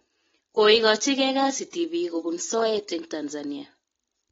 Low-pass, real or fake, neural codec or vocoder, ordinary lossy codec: 7.2 kHz; real; none; AAC, 24 kbps